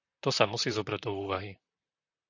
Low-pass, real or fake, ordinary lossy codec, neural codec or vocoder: 7.2 kHz; fake; AAC, 48 kbps; vocoder, 44.1 kHz, 128 mel bands, Pupu-Vocoder